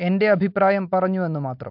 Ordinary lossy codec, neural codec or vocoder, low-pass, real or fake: MP3, 48 kbps; none; 5.4 kHz; real